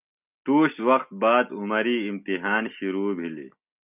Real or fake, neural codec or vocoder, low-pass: real; none; 3.6 kHz